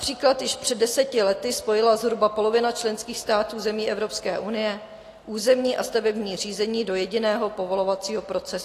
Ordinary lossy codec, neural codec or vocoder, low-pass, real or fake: AAC, 48 kbps; none; 14.4 kHz; real